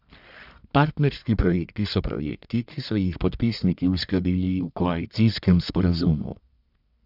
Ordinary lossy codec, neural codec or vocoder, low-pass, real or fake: none; codec, 44.1 kHz, 1.7 kbps, Pupu-Codec; 5.4 kHz; fake